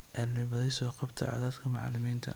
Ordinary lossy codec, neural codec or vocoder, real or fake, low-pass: none; vocoder, 44.1 kHz, 128 mel bands every 512 samples, BigVGAN v2; fake; none